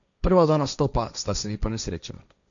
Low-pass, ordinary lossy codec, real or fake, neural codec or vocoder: 7.2 kHz; none; fake; codec, 16 kHz, 1.1 kbps, Voila-Tokenizer